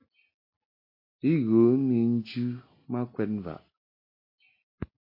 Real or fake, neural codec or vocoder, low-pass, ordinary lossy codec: real; none; 5.4 kHz; MP3, 32 kbps